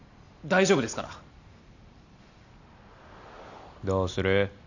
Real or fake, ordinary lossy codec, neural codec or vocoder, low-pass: real; none; none; 7.2 kHz